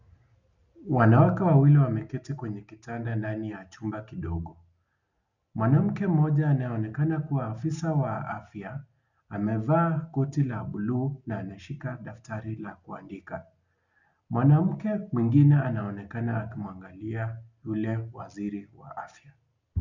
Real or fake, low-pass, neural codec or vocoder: real; 7.2 kHz; none